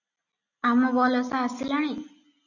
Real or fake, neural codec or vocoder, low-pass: real; none; 7.2 kHz